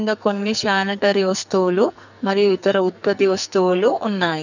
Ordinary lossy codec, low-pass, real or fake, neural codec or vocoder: none; 7.2 kHz; fake; codec, 44.1 kHz, 2.6 kbps, SNAC